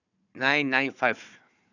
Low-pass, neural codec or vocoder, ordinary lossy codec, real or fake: 7.2 kHz; codec, 16 kHz, 4 kbps, FunCodec, trained on Chinese and English, 50 frames a second; none; fake